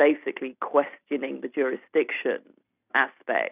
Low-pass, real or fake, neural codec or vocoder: 3.6 kHz; real; none